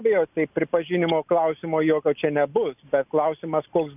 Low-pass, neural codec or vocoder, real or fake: 3.6 kHz; none; real